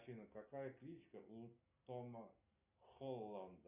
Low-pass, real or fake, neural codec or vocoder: 3.6 kHz; real; none